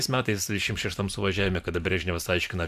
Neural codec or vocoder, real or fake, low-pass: vocoder, 44.1 kHz, 128 mel bands every 512 samples, BigVGAN v2; fake; 14.4 kHz